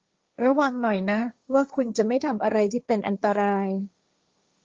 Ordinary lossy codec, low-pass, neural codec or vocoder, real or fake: Opus, 32 kbps; 7.2 kHz; codec, 16 kHz, 1.1 kbps, Voila-Tokenizer; fake